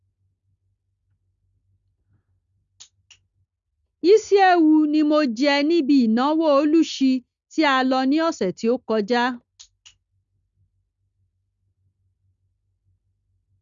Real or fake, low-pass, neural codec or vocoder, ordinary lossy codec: real; 7.2 kHz; none; Opus, 64 kbps